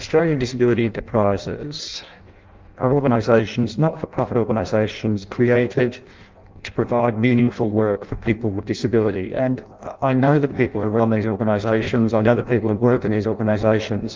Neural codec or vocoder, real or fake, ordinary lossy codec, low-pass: codec, 16 kHz in and 24 kHz out, 0.6 kbps, FireRedTTS-2 codec; fake; Opus, 32 kbps; 7.2 kHz